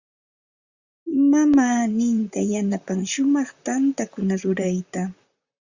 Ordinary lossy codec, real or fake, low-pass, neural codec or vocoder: Opus, 64 kbps; fake; 7.2 kHz; vocoder, 44.1 kHz, 128 mel bands, Pupu-Vocoder